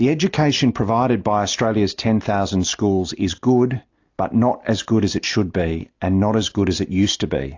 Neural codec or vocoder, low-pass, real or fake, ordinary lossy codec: none; 7.2 kHz; real; AAC, 48 kbps